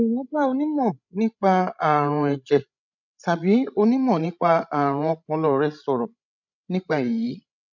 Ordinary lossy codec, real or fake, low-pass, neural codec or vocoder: none; fake; 7.2 kHz; codec, 16 kHz, 16 kbps, FreqCodec, larger model